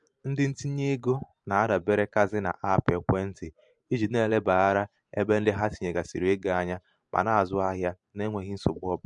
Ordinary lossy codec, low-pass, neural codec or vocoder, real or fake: MP3, 64 kbps; 10.8 kHz; vocoder, 44.1 kHz, 128 mel bands every 512 samples, BigVGAN v2; fake